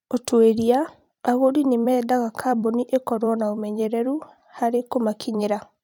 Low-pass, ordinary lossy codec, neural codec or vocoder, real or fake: 19.8 kHz; none; vocoder, 44.1 kHz, 128 mel bands every 256 samples, BigVGAN v2; fake